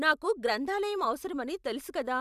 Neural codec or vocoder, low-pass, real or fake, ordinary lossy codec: vocoder, 44.1 kHz, 128 mel bands every 512 samples, BigVGAN v2; 14.4 kHz; fake; none